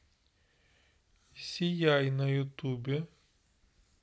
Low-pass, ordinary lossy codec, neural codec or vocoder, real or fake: none; none; none; real